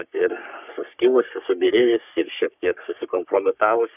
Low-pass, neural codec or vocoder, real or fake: 3.6 kHz; codec, 44.1 kHz, 3.4 kbps, Pupu-Codec; fake